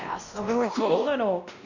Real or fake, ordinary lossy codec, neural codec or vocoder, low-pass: fake; none; codec, 16 kHz, 1 kbps, X-Codec, WavLM features, trained on Multilingual LibriSpeech; 7.2 kHz